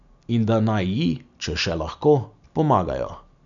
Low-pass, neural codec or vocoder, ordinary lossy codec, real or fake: 7.2 kHz; none; none; real